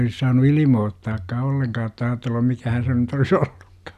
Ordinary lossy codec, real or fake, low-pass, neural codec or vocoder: none; real; 14.4 kHz; none